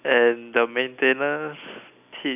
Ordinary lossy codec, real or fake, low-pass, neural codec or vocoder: none; real; 3.6 kHz; none